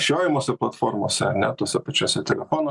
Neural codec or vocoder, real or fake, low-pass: none; real; 10.8 kHz